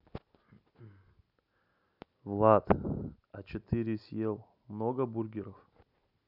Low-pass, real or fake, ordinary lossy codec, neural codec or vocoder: 5.4 kHz; real; none; none